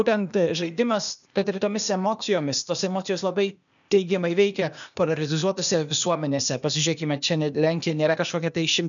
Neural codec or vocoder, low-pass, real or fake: codec, 16 kHz, 0.8 kbps, ZipCodec; 7.2 kHz; fake